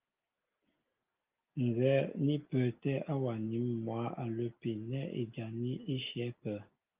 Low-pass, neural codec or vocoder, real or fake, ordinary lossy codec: 3.6 kHz; none; real; Opus, 24 kbps